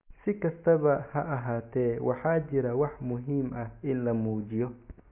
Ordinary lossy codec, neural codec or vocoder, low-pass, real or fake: MP3, 32 kbps; none; 3.6 kHz; real